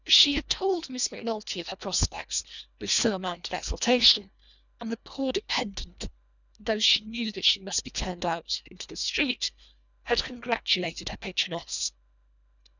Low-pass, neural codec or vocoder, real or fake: 7.2 kHz; codec, 24 kHz, 1.5 kbps, HILCodec; fake